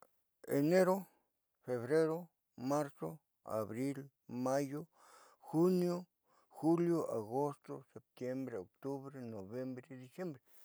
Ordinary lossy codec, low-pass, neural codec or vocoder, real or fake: none; none; none; real